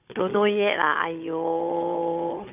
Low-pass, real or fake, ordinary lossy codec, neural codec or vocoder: 3.6 kHz; fake; none; codec, 16 kHz, 16 kbps, FunCodec, trained on Chinese and English, 50 frames a second